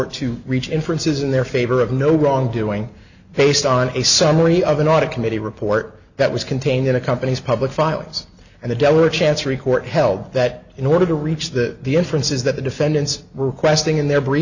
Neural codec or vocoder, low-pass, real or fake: none; 7.2 kHz; real